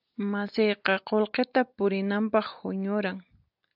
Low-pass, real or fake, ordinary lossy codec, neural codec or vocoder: 5.4 kHz; real; AAC, 48 kbps; none